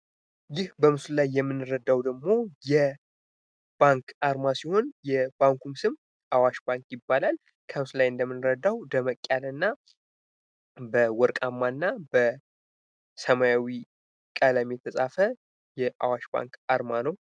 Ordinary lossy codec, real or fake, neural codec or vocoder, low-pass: MP3, 96 kbps; real; none; 9.9 kHz